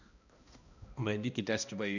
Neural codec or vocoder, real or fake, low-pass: codec, 16 kHz, 1 kbps, X-Codec, HuBERT features, trained on balanced general audio; fake; 7.2 kHz